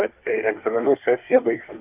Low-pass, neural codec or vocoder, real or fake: 3.6 kHz; codec, 24 kHz, 1 kbps, SNAC; fake